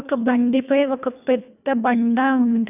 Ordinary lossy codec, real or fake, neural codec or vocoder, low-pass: none; fake; codec, 24 kHz, 1.5 kbps, HILCodec; 3.6 kHz